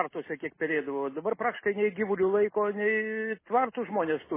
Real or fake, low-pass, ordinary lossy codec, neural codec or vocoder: real; 3.6 kHz; MP3, 16 kbps; none